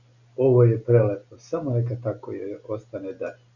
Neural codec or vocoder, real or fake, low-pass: none; real; 7.2 kHz